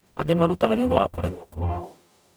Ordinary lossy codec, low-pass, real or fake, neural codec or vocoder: none; none; fake; codec, 44.1 kHz, 0.9 kbps, DAC